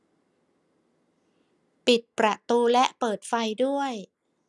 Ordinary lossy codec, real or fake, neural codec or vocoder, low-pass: none; real; none; none